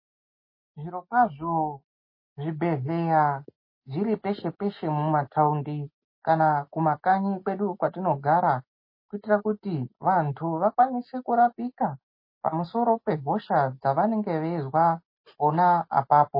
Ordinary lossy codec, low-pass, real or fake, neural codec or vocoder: MP3, 24 kbps; 5.4 kHz; real; none